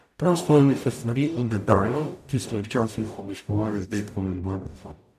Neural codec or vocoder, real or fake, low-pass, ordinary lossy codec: codec, 44.1 kHz, 0.9 kbps, DAC; fake; 14.4 kHz; none